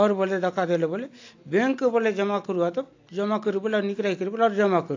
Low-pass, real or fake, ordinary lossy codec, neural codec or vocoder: 7.2 kHz; real; AAC, 48 kbps; none